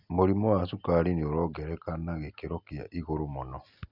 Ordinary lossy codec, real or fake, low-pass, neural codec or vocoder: none; real; 5.4 kHz; none